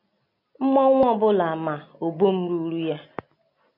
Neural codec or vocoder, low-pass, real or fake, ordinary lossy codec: none; 5.4 kHz; real; MP3, 48 kbps